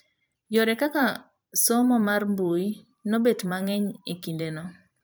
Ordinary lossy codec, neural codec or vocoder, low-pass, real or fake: none; vocoder, 44.1 kHz, 128 mel bands every 512 samples, BigVGAN v2; none; fake